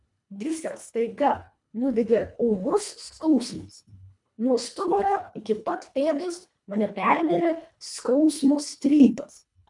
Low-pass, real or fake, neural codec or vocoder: 10.8 kHz; fake; codec, 24 kHz, 1.5 kbps, HILCodec